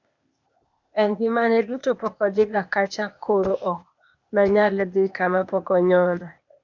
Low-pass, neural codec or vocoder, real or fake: 7.2 kHz; codec, 16 kHz, 0.8 kbps, ZipCodec; fake